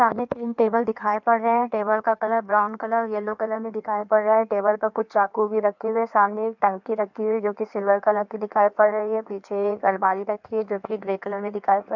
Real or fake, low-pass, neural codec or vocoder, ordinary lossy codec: fake; 7.2 kHz; codec, 16 kHz in and 24 kHz out, 1.1 kbps, FireRedTTS-2 codec; none